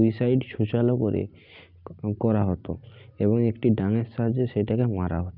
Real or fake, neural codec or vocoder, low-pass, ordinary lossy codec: real; none; 5.4 kHz; none